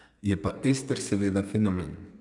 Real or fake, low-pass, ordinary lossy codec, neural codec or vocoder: fake; 10.8 kHz; none; codec, 32 kHz, 1.9 kbps, SNAC